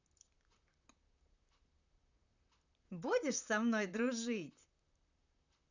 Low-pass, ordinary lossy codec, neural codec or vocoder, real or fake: 7.2 kHz; none; vocoder, 44.1 kHz, 128 mel bands, Pupu-Vocoder; fake